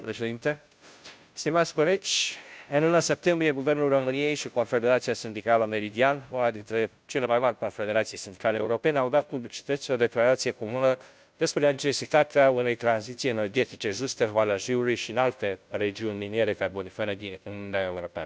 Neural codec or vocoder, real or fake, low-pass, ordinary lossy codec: codec, 16 kHz, 0.5 kbps, FunCodec, trained on Chinese and English, 25 frames a second; fake; none; none